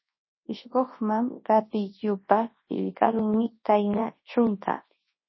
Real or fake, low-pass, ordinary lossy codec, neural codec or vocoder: fake; 7.2 kHz; MP3, 24 kbps; codec, 24 kHz, 0.9 kbps, WavTokenizer, large speech release